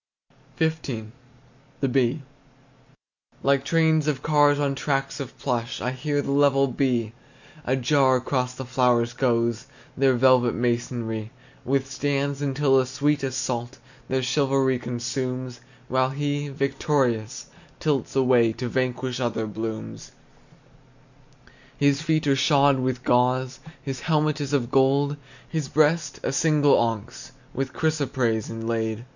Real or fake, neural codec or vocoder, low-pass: real; none; 7.2 kHz